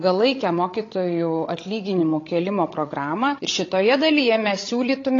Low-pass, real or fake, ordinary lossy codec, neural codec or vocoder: 7.2 kHz; fake; AAC, 32 kbps; codec, 16 kHz, 16 kbps, FreqCodec, larger model